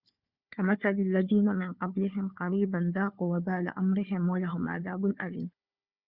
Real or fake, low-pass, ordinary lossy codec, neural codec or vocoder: fake; 5.4 kHz; Opus, 64 kbps; codec, 16 kHz, 4 kbps, FunCodec, trained on Chinese and English, 50 frames a second